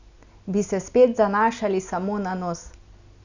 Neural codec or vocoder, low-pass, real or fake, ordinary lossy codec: none; 7.2 kHz; real; none